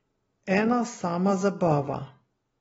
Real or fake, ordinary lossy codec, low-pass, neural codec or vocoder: real; AAC, 24 kbps; 19.8 kHz; none